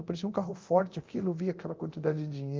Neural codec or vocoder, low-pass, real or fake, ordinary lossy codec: codec, 24 kHz, 0.9 kbps, DualCodec; 7.2 kHz; fake; Opus, 32 kbps